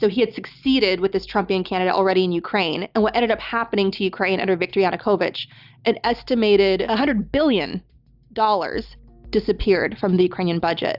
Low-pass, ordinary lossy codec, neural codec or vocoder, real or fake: 5.4 kHz; Opus, 64 kbps; none; real